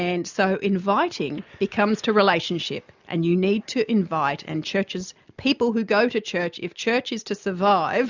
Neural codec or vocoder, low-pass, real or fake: none; 7.2 kHz; real